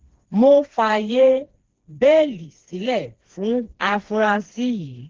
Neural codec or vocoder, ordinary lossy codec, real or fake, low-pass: codec, 16 kHz, 2 kbps, FreqCodec, smaller model; Opus, 16 kbps; fake; 7.2 kHz